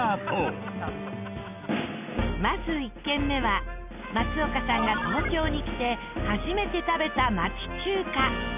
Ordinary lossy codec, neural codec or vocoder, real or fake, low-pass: none; none; real; 3.6 kHz